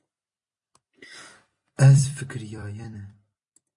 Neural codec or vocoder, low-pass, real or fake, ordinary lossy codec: vocoder, 44.1 kHz, 128 mel bands every 256 samples, BigVGAN v2; 10.8 kHz; fake; MP3, 48 kbps